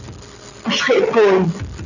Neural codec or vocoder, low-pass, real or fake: none; 7.2 kHz; real